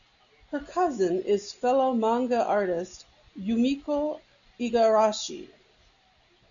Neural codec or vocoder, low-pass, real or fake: none; 7.2 kHz; real